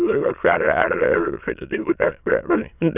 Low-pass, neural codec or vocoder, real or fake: 3.6 kHz; autoencoder, 22.05 kHz, a latent of 192 numbers a frame, VITS, trained on many speakers; fake